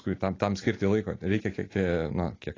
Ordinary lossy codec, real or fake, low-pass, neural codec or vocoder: AAC, 32 kbps; real; 7.2 kHz; none